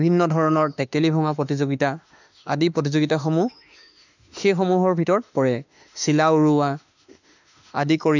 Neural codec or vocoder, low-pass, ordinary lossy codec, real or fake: autoencoder, 48 kHz, 32 numbers a frame, DAC-VAE, trained on Japanese speech; 7.2 kHz; none; fake